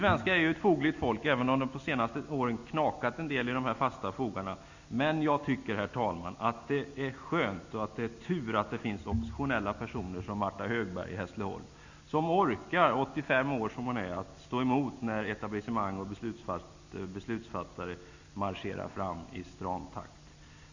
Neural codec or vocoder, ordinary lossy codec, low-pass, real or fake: none; Opus, 64 kbps; 7.2 kHz; real